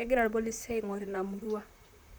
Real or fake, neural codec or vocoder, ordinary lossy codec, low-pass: fake; vocoder, 44.1 kHz, 128 mel bands, Pupu-Vocoder; none; none